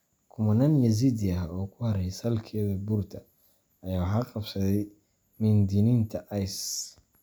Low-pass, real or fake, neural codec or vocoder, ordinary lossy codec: none; real; none; none